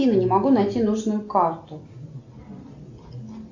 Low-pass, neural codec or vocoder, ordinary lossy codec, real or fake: 7.2 kHz; none; AAC, 48 kbps; real